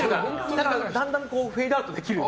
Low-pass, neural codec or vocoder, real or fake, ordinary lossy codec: none; none; real; none